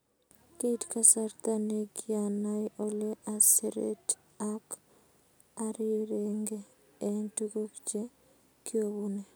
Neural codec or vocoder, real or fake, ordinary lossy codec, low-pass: none; real; none; none